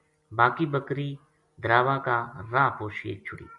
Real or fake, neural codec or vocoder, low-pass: real; none; 10.8 kHz